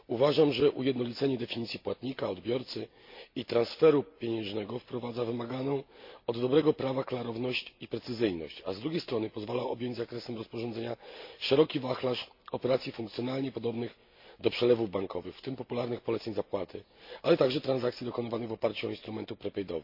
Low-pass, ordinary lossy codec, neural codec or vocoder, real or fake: 5.4 kHz; MP3, 32 kbps; none; real